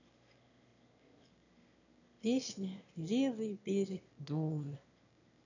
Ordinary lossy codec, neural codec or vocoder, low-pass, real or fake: none; autoencoder, 22.05 kHz, a latent of 192 numbers a frame, VITS, trained on one speaker; 7.2 kHz; fake